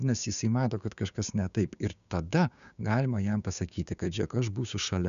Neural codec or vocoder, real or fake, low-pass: codec, 16 kHz, 6 kbps, DAC; fake; 7.2 kHz